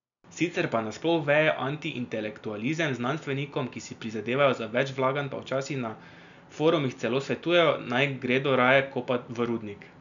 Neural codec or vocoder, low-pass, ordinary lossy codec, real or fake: none; 7.2 kHz; none; real